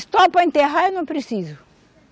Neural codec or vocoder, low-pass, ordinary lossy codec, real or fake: none; none; none; real